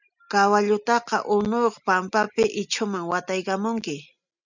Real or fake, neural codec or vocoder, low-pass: real; none; 7.2 kHz